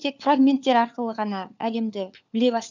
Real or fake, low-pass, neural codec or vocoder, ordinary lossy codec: fake; 7.2 kHz; codec, 16 kHz, 4 kbps, FunCodec, trained on LibriTTS, 50 frames a second; none